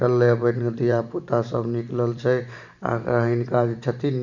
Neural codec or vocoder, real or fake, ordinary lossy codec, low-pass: vocoder, 44.1 kHz, 128 mel bands every 512 samples, BigVGAN v2; fake; none; 7.2 kHz